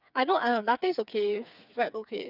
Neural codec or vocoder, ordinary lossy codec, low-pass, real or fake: codec, 16 kHz, 4 kbps, FreqCodec, smaller model; none; 5.4 kHz; fake